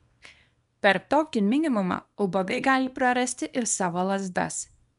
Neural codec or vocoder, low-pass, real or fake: codec, 24 kHz, 0.9 kbps, WavTokenizer, small release; 10.8 kHz; fake